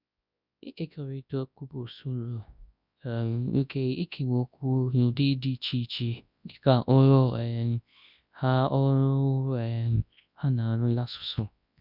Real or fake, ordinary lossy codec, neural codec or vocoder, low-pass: fake; none; codec, 24 kHz, 0.9 kbps, WavTokenizer, large speech release; 5.4 kHz